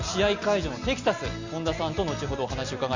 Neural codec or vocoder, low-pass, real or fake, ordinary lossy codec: none; 7.2 kHz; real; Opus, 64 kbps